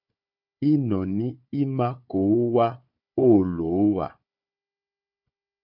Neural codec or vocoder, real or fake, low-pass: codec, 16 kHz, 4 kbps, FunCodec, trained on Chinese and English, 50 frames a second; fake; 5.4 kHz